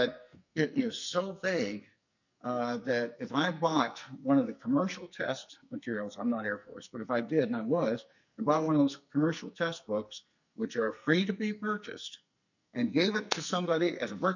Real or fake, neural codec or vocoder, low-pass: fake; codec, 44.1 kHz, 2.6 kbps, SNAC; 7.2 kHz